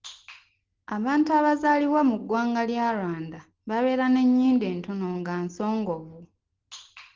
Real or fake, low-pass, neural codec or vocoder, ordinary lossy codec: real; 7.2 kHz; none; Opus, 16 kbps